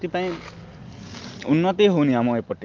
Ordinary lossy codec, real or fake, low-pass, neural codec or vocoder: Opus, 32 kbps; real; 7.2 kHz; none